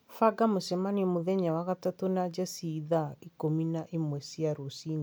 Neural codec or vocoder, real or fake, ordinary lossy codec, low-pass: none; real; none; none